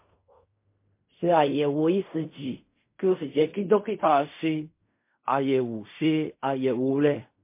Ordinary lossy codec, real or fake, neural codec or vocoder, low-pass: MP3, 24 kbps; fake; codec, 16 kHz in and 24 kHz out, 0.4 kbps, LongCat-Audio-Codec, fine tuned four codebook decoder; 3.6 kHz